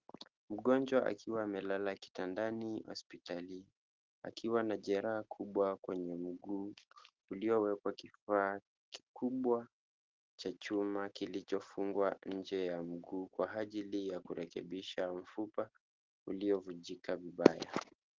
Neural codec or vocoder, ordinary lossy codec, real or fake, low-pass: none; Opus, 16 kbps; real; 7.2 kHz